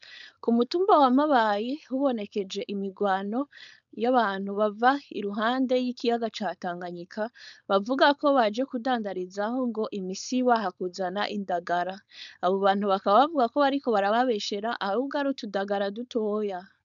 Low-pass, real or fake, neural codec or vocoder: 7.2 kHz; fake; codec, 16 kHz, 4.8 kbps, FACodec